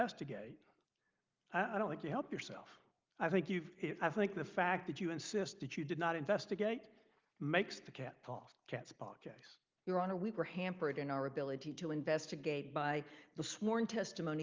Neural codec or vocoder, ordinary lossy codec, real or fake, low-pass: none; Opus, 32 kbps; real; 7.2 kHz